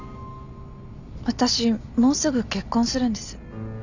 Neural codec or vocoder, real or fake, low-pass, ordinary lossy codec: none; real; 7.2 kHz; none